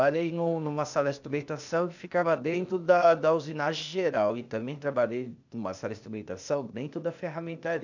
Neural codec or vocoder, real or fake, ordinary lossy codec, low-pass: codec, 16 kHz, 0.8 kbps, ZipCodec; fake; none; 7.2 kHz